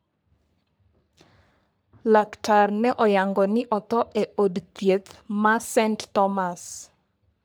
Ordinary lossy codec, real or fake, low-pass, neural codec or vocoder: none; fake; none; codec, 44.1 kHz, 3.4 kbps, Pupu-Codec